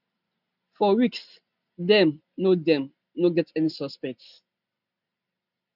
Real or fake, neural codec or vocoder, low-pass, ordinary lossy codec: real; none; 5.4 kHz; none